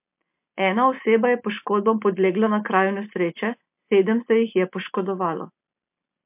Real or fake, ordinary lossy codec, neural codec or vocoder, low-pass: real; MP3, 32 kbps; none; 3.6 kHz